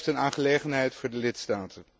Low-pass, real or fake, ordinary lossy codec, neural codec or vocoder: none; real; none; none